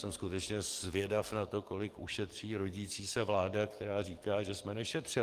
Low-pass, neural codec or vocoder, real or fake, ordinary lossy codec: 14.4 kHz; codec, 44.1 kHz, 7.8 kbps, DAC; fake; Opus, 24 kbps